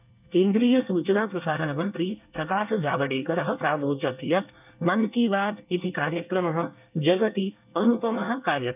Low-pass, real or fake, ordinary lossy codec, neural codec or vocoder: 3.6 kHz; fake; none; codec, 24 kHz, 1 kbps, SNAC